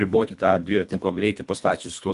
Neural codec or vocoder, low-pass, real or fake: codec, 24 kHz, 1.5 kbps, HILCodec; 10.8 kHz; fake